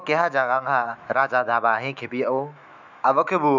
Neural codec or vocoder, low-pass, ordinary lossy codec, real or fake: autoencoder, 48 kHz, 128 numbers a frame, DAC-VAE, trained on Japanese speech; 7.2 kHz; none; fake